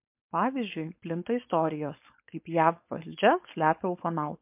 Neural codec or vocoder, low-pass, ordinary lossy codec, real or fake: codec, 16 kHz, 4.8 kbps, FACodec; 3.6 kHz; MP3, 32 kbps; fake